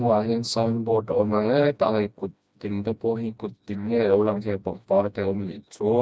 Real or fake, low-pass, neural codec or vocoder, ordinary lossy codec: fake; none; codec, 16 kHz, 1 kbps, FreqCodec, smaller model; none